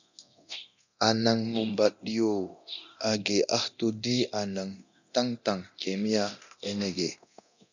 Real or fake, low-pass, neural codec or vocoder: fake; 7.2 kHz; codec, 24 kHz, 0.9 kbps, DualCodec